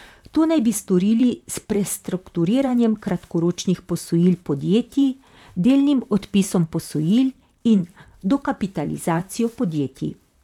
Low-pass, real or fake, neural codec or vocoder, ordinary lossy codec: 19.8 kHz; fake; vocoder, 44.1 kHz, 128 mel bands, Pupu-Vocoder; none